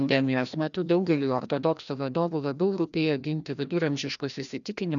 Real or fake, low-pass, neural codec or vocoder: fake; 7.2 kHz; codec, 16 kHz, 1 kbps, FreqCodec, larger model